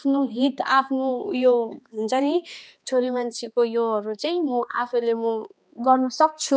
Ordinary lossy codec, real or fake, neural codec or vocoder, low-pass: none; fake; codec, 16 kHz, 2 kbps, X-Codec, HuBERT features, trained on balanced general audio; none